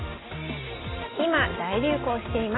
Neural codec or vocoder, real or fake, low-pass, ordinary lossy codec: none; real; 7.2 kHz; AAC, 16 kbps